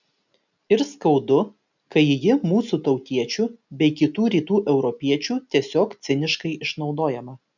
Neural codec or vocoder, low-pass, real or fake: none; 7.2 kHz; real